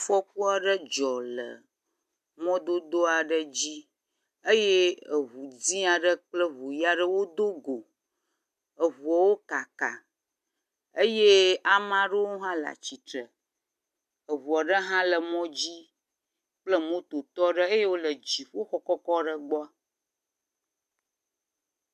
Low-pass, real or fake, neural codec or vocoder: 14.4 kHz; real; none